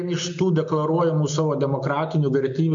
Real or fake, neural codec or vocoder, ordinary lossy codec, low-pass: real; none; MP3, 48 kbps; 7.2 kHz